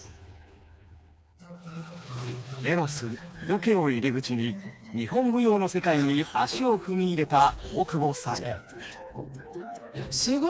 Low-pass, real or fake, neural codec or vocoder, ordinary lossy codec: none; fake; codec, 16 kHz, 2 kbps, FreqCodec, smaller model; none